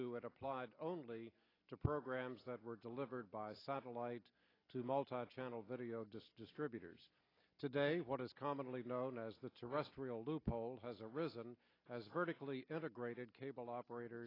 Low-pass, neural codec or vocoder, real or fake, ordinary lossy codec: 5.4 kHz; none; real; AAC, 24 kbps